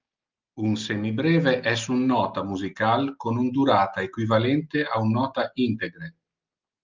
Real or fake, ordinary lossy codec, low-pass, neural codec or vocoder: real; Opus, 24 kbps; 7.2 kHz; none